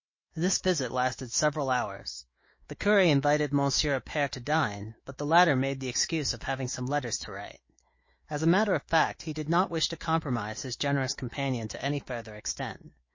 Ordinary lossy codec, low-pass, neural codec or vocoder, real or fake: MP3, 32 kbps; 7.2 kHz; autoencoder, 48 kHz, 128 numbers a frame, DAC-VAE, trained on Japanese speech; fake